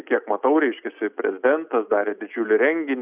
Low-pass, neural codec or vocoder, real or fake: 3.6 kHz; none; real